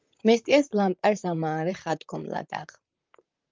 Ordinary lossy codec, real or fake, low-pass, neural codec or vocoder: Opus, 24 kbps; fake; 7.2 kHz; vocoder, 44.1 kHz, 128 mel bands, Pupu-Vocoder